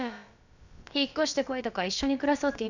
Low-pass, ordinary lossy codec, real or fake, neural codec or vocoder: 7.2 kHz; none; fake; codec, 16 kHz, about 1 kbps, DyCAST, with the encoder's durations